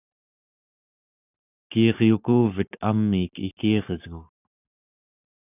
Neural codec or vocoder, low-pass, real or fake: codec, 44.1 kHz, 7.8 kbps, Pupu-Codec; 3.6 kHz; fake